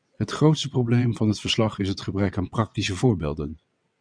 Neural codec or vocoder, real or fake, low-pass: vocoder, 22.05 kHz, 80 mel bands, WaveNeXt; fake; 9.9 kHz